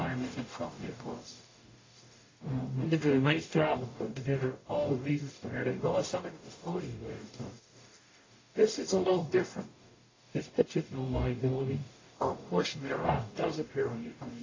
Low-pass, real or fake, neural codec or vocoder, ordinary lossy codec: 7.2 kHz; fake; codec, 44.1 kHz, 0.9 kbps, DAC; AAC, 32 kbps